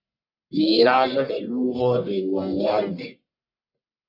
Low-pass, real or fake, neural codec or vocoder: 5.4 kHz; fake; codec, 44.1 kHz, 1.7 kbps, Pupu-Codec